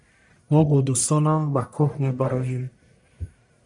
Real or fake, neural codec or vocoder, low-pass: fake; codec, 44.1 kHz, 1.7 kbps, Pupu-Codec; 10.8 kHz